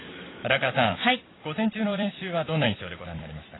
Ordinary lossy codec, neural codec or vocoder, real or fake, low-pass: AAC, 16 kbps; vocoder, 44.1 kHz, 128 mel bands every 256 samples, BigVGAN v2; fake; 7.2 kHz